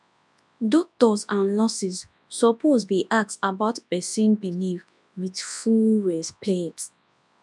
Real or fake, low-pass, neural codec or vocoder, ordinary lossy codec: fake; none; codec, 24 kHz, 0.9 kbps, WavTokenizer, large speech release; none